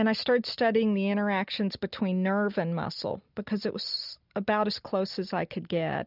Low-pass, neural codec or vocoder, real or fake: 5.4 kHz; none; real